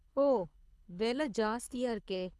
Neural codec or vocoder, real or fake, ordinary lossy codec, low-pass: codec, 24 kHz, 6 kbps, HILCodec; fake; none; none